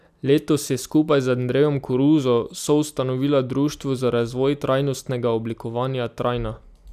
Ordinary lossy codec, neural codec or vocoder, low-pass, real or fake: none; none; 14.4 kHz; real